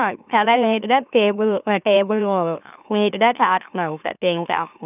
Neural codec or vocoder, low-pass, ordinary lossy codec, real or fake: autoencoder, 44.1 kHz, a latent of 192 numbers a frame, MeloTTS; 3.6 kHz; none; fake